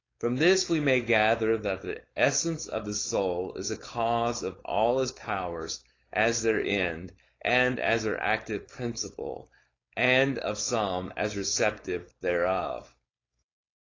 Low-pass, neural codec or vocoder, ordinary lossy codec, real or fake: 7.2 kHz; codec, 16 kHz, 4.8 kbps, FACodec; AAC, 32 kbps; fake